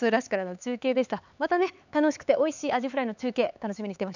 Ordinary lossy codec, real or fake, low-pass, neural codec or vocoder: none; fake; 7.2 kHz; codec, 16 kHz, 4 kbps, X-Codec, HuBERT features, trained on LibriSpeech